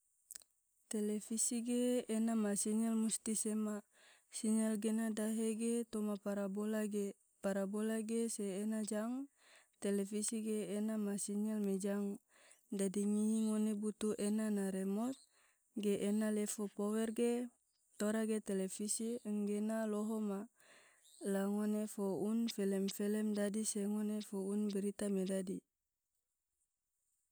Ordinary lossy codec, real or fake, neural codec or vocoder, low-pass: none; real; none; none